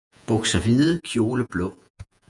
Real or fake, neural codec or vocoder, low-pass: fake; vocoder, 48 kHz, 128 mel bands, Vocos; 10.8 kHz